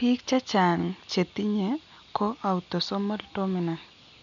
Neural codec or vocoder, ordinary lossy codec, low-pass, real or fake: none; none; 7.2 kHz; real